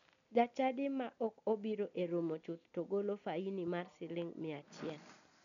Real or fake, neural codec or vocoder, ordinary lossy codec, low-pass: real; none; none; 7.2 kHz